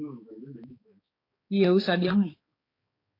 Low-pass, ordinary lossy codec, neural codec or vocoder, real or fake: 5.4 kHz; AAC, 24 kbps; codec, 16 kHz, 4 kbps, X-Codec, HuBERT features, trained on balanced general audio; fake